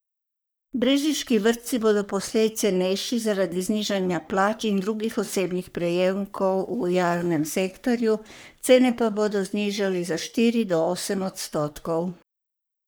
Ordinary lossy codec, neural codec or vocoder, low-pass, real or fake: none; codec, 44.1 kHz, 3.4 kbps, Pupu-Codec; none; fake